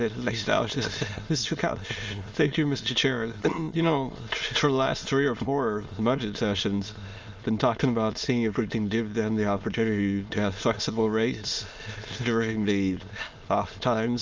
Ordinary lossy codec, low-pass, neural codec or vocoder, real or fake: Opus, 32 kbps; 7.2 kHz; autoencoder, 22.05 kHz, a latent of 192 numbers a frame, VITS, trained on many speakers; fake